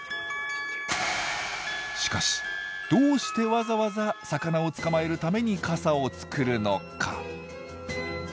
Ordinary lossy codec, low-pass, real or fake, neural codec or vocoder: none; none; real; none